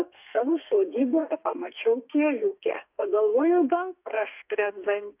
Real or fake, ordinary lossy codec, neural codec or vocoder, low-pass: fake; AAC, 32 kbps; codec, 32 kHz, 1.9 kbps, SNAC; 3.6 kHz